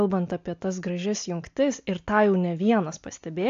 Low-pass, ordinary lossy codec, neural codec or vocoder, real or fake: 7.2 kHz; AAC, 48 kbps; none; real